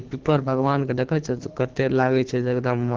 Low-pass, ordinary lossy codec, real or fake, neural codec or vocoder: 7.2 kHz; Opus, 16 kbps; fake; codec, 24 kHz, 3 kbps, HILCodec